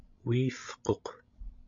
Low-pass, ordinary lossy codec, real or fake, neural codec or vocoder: 7.2 kHz; MP3, 64 kbps; fake; codec, 16 kHz, 16 kbps, FreqCodec, larger model